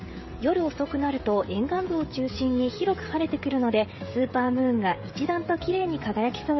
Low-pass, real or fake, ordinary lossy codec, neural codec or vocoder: 7.2 kHz; fake; MP3, 24 kbps; codec, 16 kHz, 16 kbps, FreqCodec, smaller model